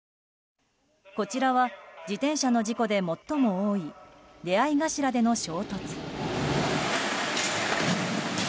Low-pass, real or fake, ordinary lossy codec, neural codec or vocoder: none; real; none; none